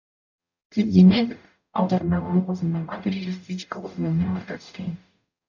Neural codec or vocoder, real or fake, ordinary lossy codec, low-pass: codec, 44.1 kHz, 0.9 kbps, DAC; fake; none; 7.2 kHz